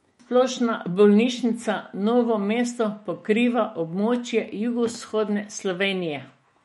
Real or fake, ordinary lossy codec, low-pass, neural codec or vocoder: fake; MP3, 48 kbps; 19.8 kHz; autoencoder, 48 kHz, 128 numbers a frame, DAC-VAE, trained on Japanese speech